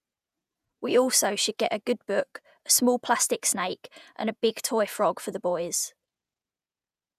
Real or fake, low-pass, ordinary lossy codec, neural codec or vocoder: fake; 14.4 kHz; none; vocoder, 48 kHz, 128 mel bands, Vocos